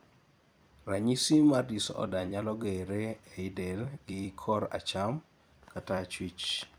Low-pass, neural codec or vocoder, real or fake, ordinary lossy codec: none; vocoder, 44.1 kHz, 128 mel bands every 256 samples, BigVGAN v2; fake; none